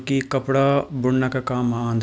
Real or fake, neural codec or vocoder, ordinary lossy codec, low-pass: real; none; none; none